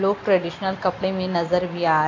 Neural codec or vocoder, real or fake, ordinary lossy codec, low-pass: none; real; AAC, 32 kbps; 7.2 kHz